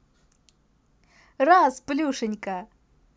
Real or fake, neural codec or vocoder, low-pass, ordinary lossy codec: real; none; none; none